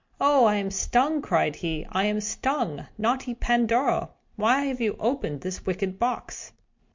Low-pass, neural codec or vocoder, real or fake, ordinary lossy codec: 7.2 kHz; none; real; MP3, 48 kbps